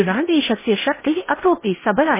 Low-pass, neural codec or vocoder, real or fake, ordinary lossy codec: 3.6 kHz; codec, 16 kHz in and 24 kHz out, 0.8 kbps, FocalCodec, streaming, 65536 codes; fake; MP3, 16 kbps